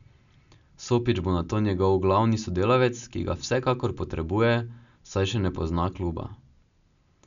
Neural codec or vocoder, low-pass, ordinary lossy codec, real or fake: none; 7.2 kHz; none; real